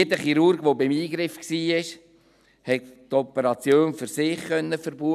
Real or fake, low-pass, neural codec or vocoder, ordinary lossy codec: real; 14.4 kHz; none; none